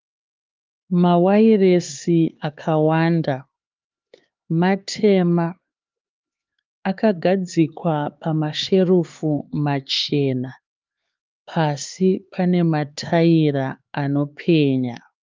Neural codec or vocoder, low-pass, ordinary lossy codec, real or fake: codec, 16 kHz, 4 kbps, X-Codec, HuBERT features, trained on LibriSpeech; 7.2 kHz; Opus, 24 kbps; fake